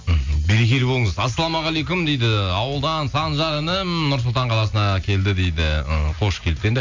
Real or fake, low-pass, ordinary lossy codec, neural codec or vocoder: real; 7.2 kHz; MP3, 64 kbps; none